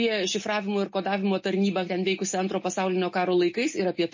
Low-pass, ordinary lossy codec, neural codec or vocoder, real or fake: 7.2 kHz; MP3, 32 kbps; none; real